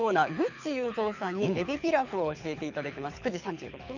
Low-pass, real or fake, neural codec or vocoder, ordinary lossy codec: 7.2 kHz; fake; codec, 24 kHz, 6 kbps, HILCodec; none